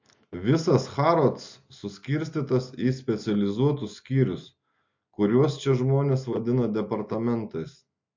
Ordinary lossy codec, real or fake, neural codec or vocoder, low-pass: MP3, 48 kbps; real; none; 7.2 kHz